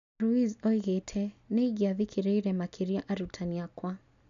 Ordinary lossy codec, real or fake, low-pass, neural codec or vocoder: MP3, 96 kbps; real; 7.2 kHz; none